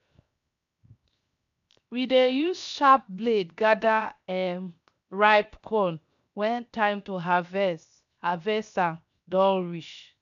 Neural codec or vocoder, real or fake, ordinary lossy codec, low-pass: codec, 16 kHz, 0.7 kbps, FocalCodec; fake; none; 7.2 kHz